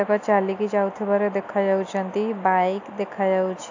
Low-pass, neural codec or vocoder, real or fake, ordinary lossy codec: 7.2 kHz; none; real; AAC, 48 kbps